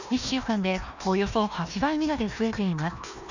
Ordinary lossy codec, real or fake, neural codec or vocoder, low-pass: none; fake; codec, 16 kHz, 1 kbps, FunCodec, trained on Chinese and English, 50 frames a second; 7.2 kHz